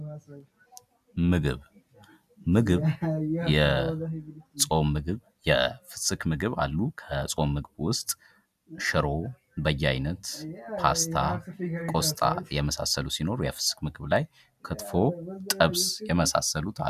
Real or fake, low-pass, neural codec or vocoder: real; 14.4 kHz; none